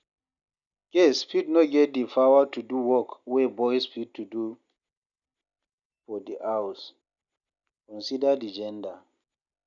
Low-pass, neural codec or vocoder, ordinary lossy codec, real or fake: 7.2 kHz; none; MP3, 96 kbps; real